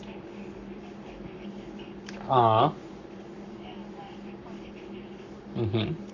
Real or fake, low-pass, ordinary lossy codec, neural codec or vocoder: fake; 7.2 kHz; none; codec, 44.1 kHz, 7.8 kbps, Pupu-Codec